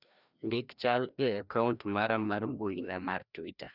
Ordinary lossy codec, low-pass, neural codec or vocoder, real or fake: none; 5.4 kHz; codec, 16 kHz, 1 kbps, FreqCodec, larger model; fake